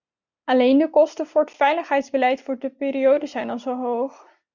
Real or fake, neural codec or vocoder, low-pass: real; none; 7.2 kHz